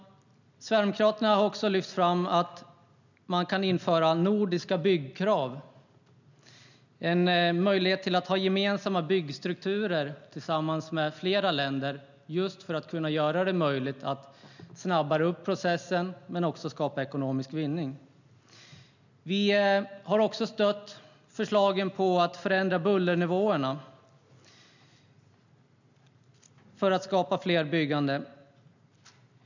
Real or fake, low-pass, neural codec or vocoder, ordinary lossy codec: real; 7.2 kHz; none; none